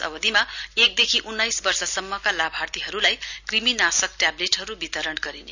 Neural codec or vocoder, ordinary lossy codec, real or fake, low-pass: none; none; real; 7.2 kHz